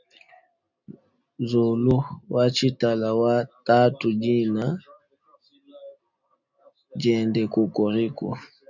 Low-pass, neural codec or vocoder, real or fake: 7.2 kHz; none; real